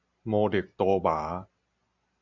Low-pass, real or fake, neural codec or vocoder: 7.2 kHz; real; none